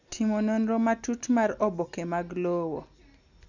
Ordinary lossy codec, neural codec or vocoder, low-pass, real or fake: none; none; 7.2 kHz; real